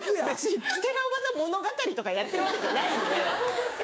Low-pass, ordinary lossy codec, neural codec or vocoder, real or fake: none; none; codec, 16 kHz, 6 kbps, DAC; fake